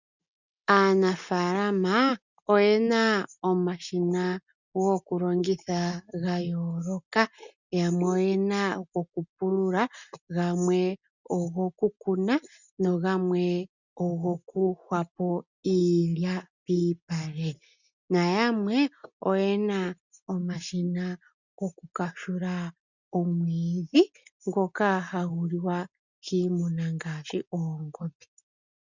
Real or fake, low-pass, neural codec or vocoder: real; 7.2 kHz; none